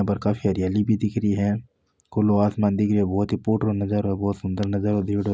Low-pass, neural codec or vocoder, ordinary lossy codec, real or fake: none; none; none; real